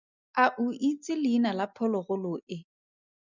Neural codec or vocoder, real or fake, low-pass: none; real; 7.2 kHz